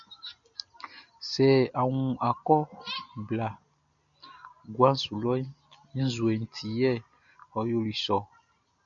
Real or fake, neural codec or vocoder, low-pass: real; none; 7.2 kHz